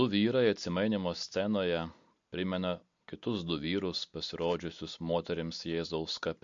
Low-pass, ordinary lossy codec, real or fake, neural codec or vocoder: 7.2 kHz; MP3, 48 kbps; real; none